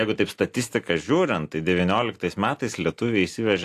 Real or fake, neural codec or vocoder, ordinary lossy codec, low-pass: real; none; AAC, 64 kbps; 14.4 kHz